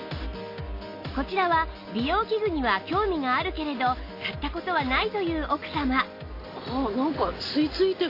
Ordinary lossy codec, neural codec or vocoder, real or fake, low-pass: AAC, 32 kbps; none; real; 5.4 kHz